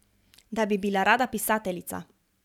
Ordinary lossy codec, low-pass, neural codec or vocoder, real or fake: none; 19.8 kHz; vocoder, 44.1 kHz, 128 mel bands every 512 samples, BigVGAN v2; fake